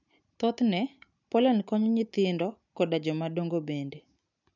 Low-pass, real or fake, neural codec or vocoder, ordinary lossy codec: 7.2 kHz; real; none; none